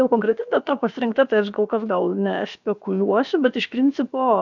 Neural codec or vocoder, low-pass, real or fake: codec, 16 kHz, about 1 kbps, DyCAST, with the encoder's durations; 7.2 kHz; fake